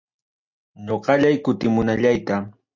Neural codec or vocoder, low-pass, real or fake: none; 7.2 kHz; real